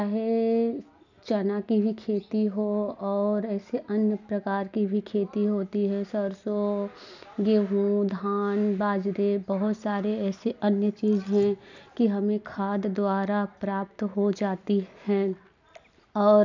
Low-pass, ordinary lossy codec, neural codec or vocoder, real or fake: 7.2 kHz; none; none; real